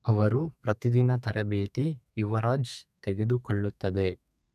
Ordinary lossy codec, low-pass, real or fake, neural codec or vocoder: none; 14.4 kHz; fake; codec, 32 kHz, 1.9 kbps, SNAC